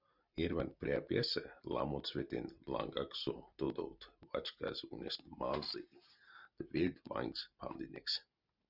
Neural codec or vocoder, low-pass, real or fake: none; 5.4 kHz; real